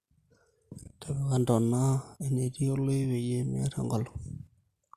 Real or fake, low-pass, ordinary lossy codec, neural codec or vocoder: real; 14.4 kHz; Opus, 64 kbps; none